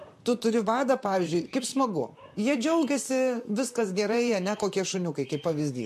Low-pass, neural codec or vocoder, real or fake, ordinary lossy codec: 14.4 kHz; vocoder, 44.1 kHz, 128 mel bands, Pupu-Vocoder; fake; MP3, 64 kbps